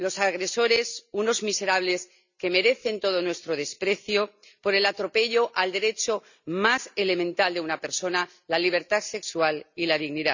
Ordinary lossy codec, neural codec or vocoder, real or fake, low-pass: none; none; real; 7.2 kHz